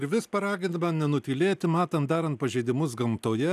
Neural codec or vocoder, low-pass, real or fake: none; 14.4 kHz; real